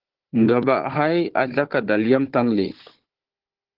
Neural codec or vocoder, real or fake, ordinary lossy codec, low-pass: codec, 16 kHz, 4 kbps, FunCodec, trained on Chinese and English, 50 frames a second; fake; Opus, 16 kbps; 5.4 kHz